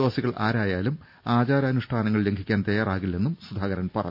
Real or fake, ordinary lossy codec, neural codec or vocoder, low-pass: real; none; none; 5.4 kHz